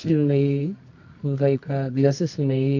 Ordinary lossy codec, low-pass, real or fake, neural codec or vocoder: none; 7.2 kHz; fake; codec, 24 kHz, 0.9 kbps, WavTokenizer, medium music audio release